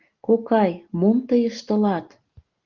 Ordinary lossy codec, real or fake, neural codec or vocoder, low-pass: Opus, 32 kbps; real; none; 7.2 kHz